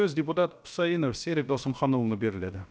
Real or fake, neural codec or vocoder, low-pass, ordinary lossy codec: fake; codec, 16 kHz, about 1 kbps, DyCAST, with the encoder's durations; none; none